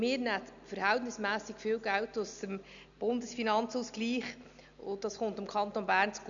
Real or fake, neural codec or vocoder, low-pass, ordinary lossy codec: real; none; 7.2 kHz; none